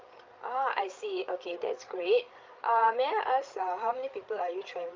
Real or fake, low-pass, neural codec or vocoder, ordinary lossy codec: fake; 7.2 kHz; codec, 16 kHz, 8 kbps, FreqCodec, larger model; Opus, 24 kbps